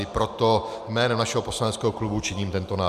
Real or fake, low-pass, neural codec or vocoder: real; 14.4 kHz; none